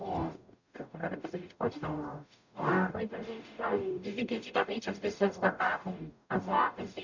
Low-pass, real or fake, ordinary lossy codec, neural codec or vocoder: 7.2 kHz; fake; none; codec, 44.1 kHz, 0.9 kbps, DAC